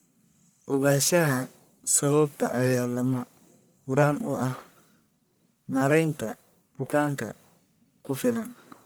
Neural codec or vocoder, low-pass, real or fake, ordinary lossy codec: codec, 44.1 kHz, 1.7 kbps, Pupu-Codec; none; fake; none